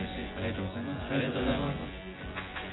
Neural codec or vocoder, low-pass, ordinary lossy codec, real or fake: vocoder, 24 kHz, 100 mel bands, Vocos; 7.2 kHz; AAC, 16 kbps; fake